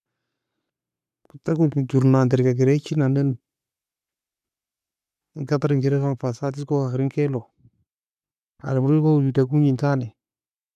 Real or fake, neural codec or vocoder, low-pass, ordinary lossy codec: fake; codec, 44.1 kHz, 7.8 kbps, Pupu-Codec; 14.4 kHz; none